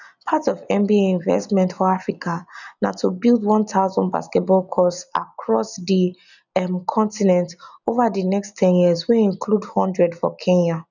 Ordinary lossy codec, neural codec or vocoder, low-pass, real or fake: none; none; 7.2 kHz; real